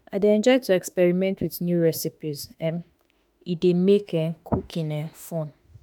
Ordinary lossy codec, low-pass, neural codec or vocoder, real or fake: none; none; autoencoder, 48 kHz, 32 numbers a frame, DAC-VAE, trained on Japanese speech; fake